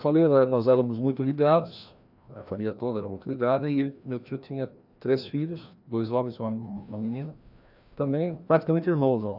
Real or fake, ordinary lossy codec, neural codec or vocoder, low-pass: fake; none; codec, 16 kHz, 1 kbps, FreqCodec, larger model; 5.4 kHz